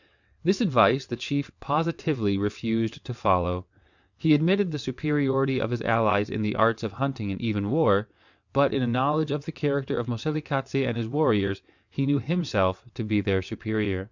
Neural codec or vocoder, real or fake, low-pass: vocoder, 22.05 kHz, 80 mel bands, WaveNeXt; fake; 7.2 kHz